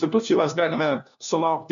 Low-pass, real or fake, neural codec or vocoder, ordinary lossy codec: 7.2 kHz; fake; codec, 16 kHz, 1 kbps, FunCodec, trained on LibriTTS, 50 frames a second; MP3, 96 kbps